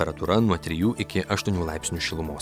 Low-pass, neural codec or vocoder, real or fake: 19.8 kHz; none; real